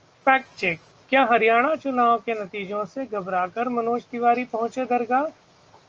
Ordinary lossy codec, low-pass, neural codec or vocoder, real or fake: Opus, 24 kbps; 7.2 kHz; none; real